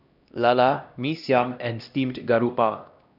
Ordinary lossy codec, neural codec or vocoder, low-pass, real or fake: none; codec, 16 kHz, 1 kbps, X-Codec, HuBERT features, trained on LibriSpeech; 5.4 kHz; fake